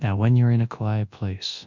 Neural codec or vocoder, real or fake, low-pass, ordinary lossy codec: codec, 24 kHz, 0.9 kbps, WavTokenizer, large speech release; fake; 7.2 kHz; Opus, 64 kbps